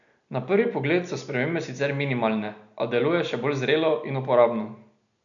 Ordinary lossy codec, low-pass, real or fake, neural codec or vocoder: none; 7.2 kHz; real; none